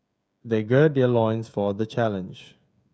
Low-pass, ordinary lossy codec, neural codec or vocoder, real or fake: none; none; codec, 16 kHz, 16 kbps, FreqCodec, smaller model; fake